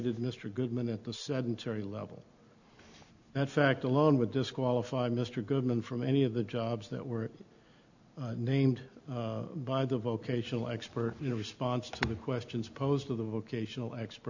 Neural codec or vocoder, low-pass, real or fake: none; 7.2 kHz; real